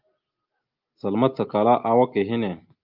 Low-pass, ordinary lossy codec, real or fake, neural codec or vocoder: 5.4 kHz; Opus, 32 kbps; real; none